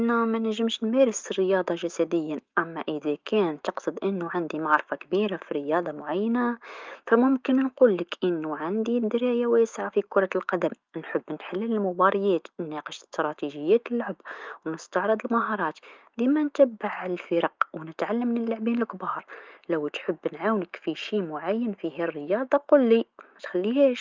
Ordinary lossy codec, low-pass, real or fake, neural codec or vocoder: Opus, 24 kbps; 7.2 kHz; fake; autoencoder, 48 kHz, 128 numbers a frame, DAC-VAE, trained on Japanese speech